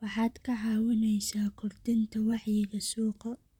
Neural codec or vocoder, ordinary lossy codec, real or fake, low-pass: vocoder, 44.1 kHz, 128 mel bands, Pupu-Vocoder; none; fake; 19.8 kHz